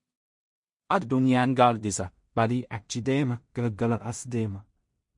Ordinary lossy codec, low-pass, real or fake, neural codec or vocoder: MP3, 48 kbps; 10.8 kHz; fake; codec, 16 kHz in and 24 kHz out, 0.4 kbps, LongCat-Audio-Codec, two codebook decoder